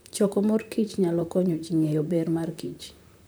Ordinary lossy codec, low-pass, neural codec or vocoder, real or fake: none; none; vocoder, 44.1 kHz, 128 mel bands, Pupu-Vocoder; fake